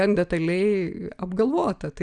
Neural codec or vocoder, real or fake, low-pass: none; real; 9.9 kHz